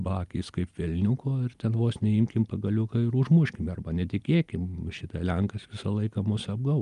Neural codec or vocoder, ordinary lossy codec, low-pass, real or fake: none; Opus, 32 kbps; 10.8 kHz; real